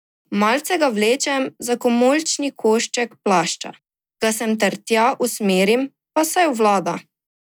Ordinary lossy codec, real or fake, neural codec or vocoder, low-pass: none; real; none; none